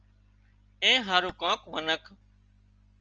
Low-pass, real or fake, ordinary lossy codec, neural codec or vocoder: 7.2 kHz; real; Opus, 24 kbps; none